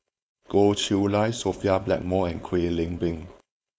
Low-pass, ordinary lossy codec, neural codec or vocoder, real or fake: none; none; codec, 16 kHz, 4.8 kbps, FACodec; fake